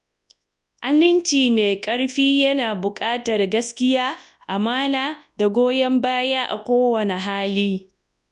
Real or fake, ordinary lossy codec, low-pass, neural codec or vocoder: fake; none; 10.8 kHz; codec, 24 kHz, 0.9 kbps, WavTokenizer, large speech release